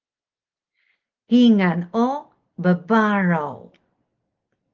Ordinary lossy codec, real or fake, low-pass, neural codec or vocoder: Opus, 16 kbps; real; 7.2 kHz; none